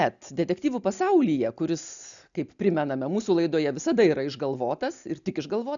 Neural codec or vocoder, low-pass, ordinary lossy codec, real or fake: none; 7.2 kHz; MP3, 96 kbps; real